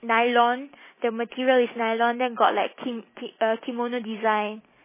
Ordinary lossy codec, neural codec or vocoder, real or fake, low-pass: MP3, 16 kbps; none; real; 3.6 kHz